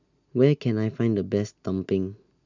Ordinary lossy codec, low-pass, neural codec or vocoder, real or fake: none; 7.2 kHz; none; real